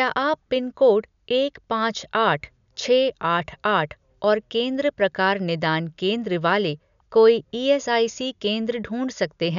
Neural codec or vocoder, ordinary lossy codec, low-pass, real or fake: none; none; 7.2 kHz; real